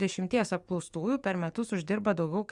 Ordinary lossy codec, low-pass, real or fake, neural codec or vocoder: Opus, 64 kbps; 10.8 kHz; fake; codec, 44.1 kHz, 7.8 kbps, DAC